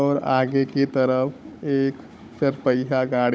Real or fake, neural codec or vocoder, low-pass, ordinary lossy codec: fake; codec, 16 kHz, 16 kbps, FunCodec, trained on Chinese and English, 50 frames a second; none; none